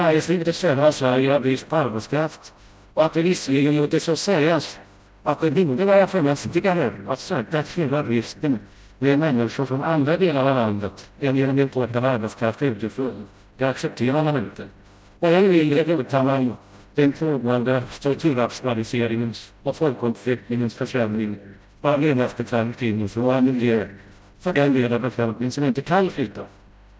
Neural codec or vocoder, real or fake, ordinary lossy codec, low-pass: codec, 16 kHz, 0.5 kbps, FreqCodec, smaller model; fake; none; none